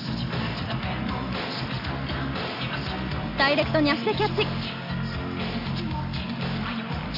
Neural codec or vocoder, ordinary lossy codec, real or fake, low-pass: none; none; real; 5.4 kHz